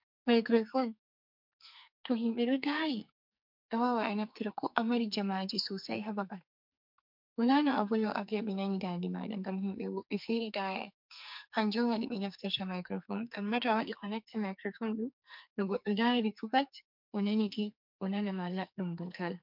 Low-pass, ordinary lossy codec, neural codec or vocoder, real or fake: 5.4 kHz; MP3, 48 kbps; codec, 44.1 kHz, 2.6 kbps, SNAC; fake